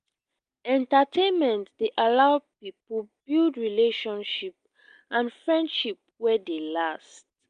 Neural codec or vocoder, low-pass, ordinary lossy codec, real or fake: none; 9.9 kHz; Opus, 32 kbps; real